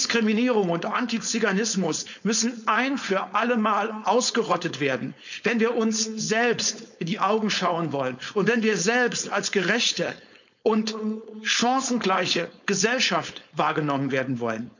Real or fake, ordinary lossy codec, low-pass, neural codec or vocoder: fake; none; 7.2 kHz; codec, 16 kHz, 4.8 kbps, FACodec